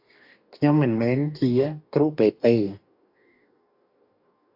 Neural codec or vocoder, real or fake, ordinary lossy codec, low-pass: codec, 44.1 kHz, 2.6 kbps, DAC; fake; AAC, 48 kbps; 5.4 kHz